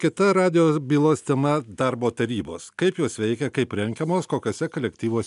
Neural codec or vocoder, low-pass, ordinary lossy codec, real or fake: none; 10.8 kHz; MP3, 96 kbps; real